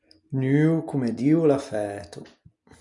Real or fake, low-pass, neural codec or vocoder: real; 10.8 kHz; none